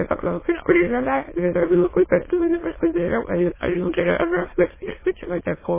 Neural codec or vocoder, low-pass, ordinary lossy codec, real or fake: autoencoder, 22.05 kHz, a latent of 192 numbers a frame, VITS, trained on many speakers; 3.6 kHz; MP3, 16 kbps; fake